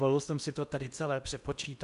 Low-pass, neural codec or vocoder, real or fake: 10.8 kHz; codec, 16 kHz in and 24 kHz out, 0.8 kbps, FocalCodec, streaming, 65536 codes; fake